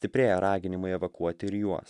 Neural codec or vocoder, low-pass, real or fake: none; 10.8 kHz; real